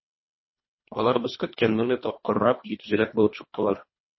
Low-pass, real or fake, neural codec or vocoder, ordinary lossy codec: 7.2 kHz; fake; codec, 24 kHz, 1.5 kbps, HILCodec; MP3, 24 kbps